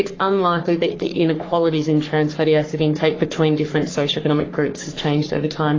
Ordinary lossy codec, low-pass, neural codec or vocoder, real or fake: AAC, 32 kbps; 7.2 kHz; codec, 44.1 kHz, 3.4 kbps, Pupu-Codec; fake